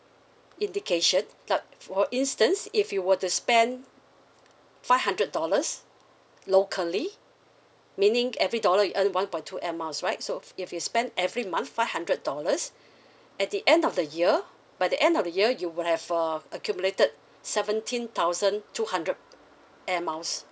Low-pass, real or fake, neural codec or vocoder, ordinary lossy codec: none; real; none; none